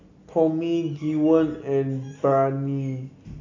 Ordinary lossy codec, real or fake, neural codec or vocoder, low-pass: AAC, 48 kbps; real; none; 7.2 kHz